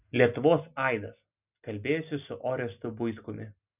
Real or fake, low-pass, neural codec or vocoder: real; 3.6 kHz; none